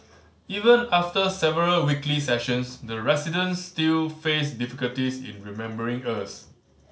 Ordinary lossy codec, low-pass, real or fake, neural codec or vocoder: none; none; real; none